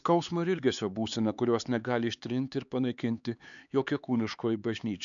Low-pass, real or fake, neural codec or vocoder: 7.2 kHz; fake; codec, 16 kHz, 4 kbps, X-Codec, HuBERT features, trained on LibriSpeech